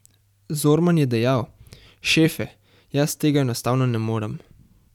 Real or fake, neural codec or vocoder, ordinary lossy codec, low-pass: fake; vocoder, 44.1 kHz, 128 mel bands every 256 samples, BigVGAN v2; none; 19.8 kHz